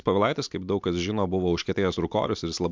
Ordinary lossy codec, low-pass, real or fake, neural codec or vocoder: MP3, 64 kbps; 7.2 kHz; real; none